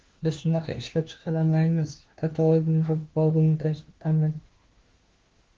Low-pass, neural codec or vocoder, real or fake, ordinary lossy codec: 7.2 kHz; codec, 16 kHz, 1 kbps, FunCodec, trained on LibriTTS, 50 frames a second; fake; Opus, 16 kbps